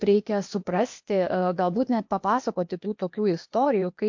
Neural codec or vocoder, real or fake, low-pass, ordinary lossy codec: codec, 16 kHz, 0.8 kbps, ZipCodec; fake; 7.2 kHz; MP3, 48 kbps